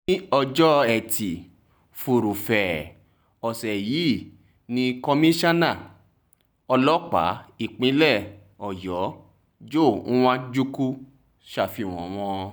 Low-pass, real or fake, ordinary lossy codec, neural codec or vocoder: none; real; none; none